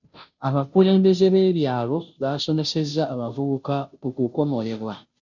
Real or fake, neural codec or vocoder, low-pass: fake; codec, 16 kHz, 0.5 kbps, FunCodec, trained on Chinese and English, 25 frames a second; 7.2 kHz